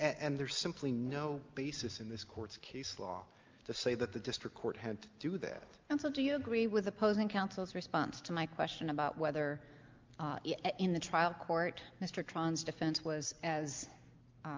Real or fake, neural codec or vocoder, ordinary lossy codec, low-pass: real; none; Opus, 24 kbps; 7.2 kHz